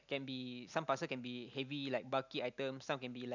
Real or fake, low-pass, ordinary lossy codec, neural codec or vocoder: real; 7.2 kHz; none; none